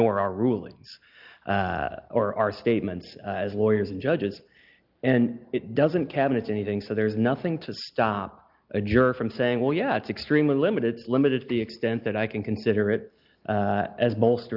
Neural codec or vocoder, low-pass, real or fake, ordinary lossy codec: none; 5.4 kHz; real; Opus, 24 kbps